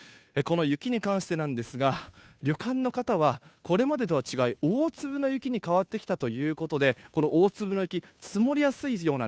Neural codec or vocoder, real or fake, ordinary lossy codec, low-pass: codec, 16 kHz, 2 kbps, FunCodec, trained on Chinese and English, 25 frames a second; fake; none; none